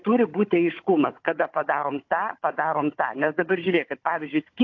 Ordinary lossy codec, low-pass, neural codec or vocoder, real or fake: AAC, 48 kbps; 7.2 kHz; codec, 16 kHz, 16 kbps, FunCodec, trained on Chinese and English, 50 frames a second; fake